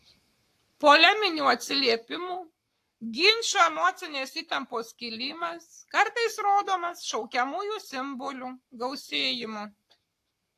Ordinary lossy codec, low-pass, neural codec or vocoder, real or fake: AAC, 64 kbps; 14.4 kHz; codec, 44.1 kHz, 7.8 kbps, Pupu-Codec; fake